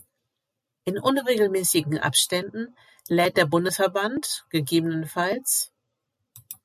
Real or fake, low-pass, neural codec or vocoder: real; 14.4 kHz; none